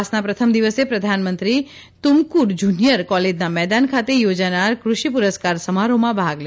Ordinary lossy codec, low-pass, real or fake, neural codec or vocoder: none; none; real; none